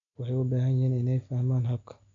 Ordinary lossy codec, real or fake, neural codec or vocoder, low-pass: AAC, 64 kbps; real; none; 7.2 kHz